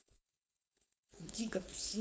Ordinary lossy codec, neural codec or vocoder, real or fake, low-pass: none; codec, 16 kHz, 4.8 kbps, FACodec; fake; none